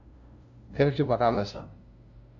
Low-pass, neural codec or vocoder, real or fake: 7.2 kHz; codec, 16 kHz, 0.5 kbps, FunCodec, trained on LibriTTS, 25 frames a second; fake